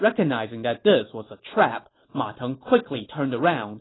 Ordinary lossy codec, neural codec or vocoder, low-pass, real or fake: AAC, 16 kbps; none; 7.2 kHz; real